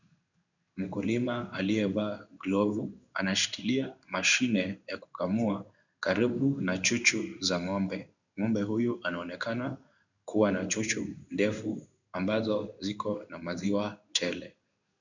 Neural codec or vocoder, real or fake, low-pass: codec, 16 kHz in and 24 kHz out, 1 kbps, XY-Tokenizer; fake; 7.2 kHz